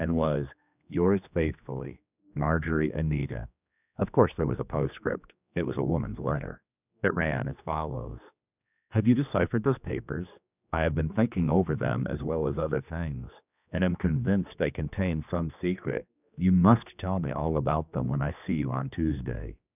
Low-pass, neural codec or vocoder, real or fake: 3.6 kHz; codec, 16 kHz, 2 kbps, X-Codec, HuBERT features, trained on general audio; fake